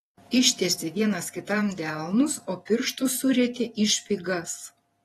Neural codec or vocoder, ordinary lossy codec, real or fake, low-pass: vocoder, 48 kHz, 128 mel bands, Vocos; AAC, 32 kbps; fake; 19.8 kHz